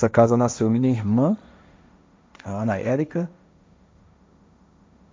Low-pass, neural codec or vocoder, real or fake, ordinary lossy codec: none; codec, 16 kHz, 1.1 kbps, Voila-Tokenizer; fake; none